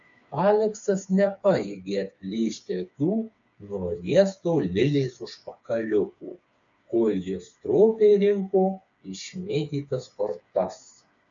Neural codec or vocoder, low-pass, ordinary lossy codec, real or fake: codec, 16 kHz, 4 kbps, FreqCodec, smaller model; 7.2 kHz; MP3, 64 kbps; fake